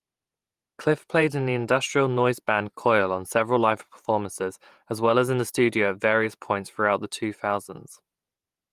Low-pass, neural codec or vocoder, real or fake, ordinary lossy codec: 14.4 kHz; vocoder, 48 kHz, 128 mel bands, Vocos; fake; Opus, 32 kbps